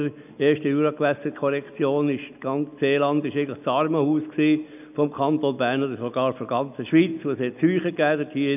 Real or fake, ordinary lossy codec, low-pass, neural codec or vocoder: real; none; 3.6 kHz; none